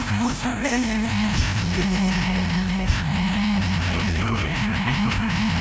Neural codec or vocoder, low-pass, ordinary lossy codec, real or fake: codec, 16 kHz, 0.5 kbps, FreqCodec, larger model; none; none; fake